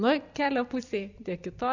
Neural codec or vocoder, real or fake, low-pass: vocoder, 44.1 kHz, 128 mel bands every 256 samples, BigVGAN v2; fake; 7.2 kHz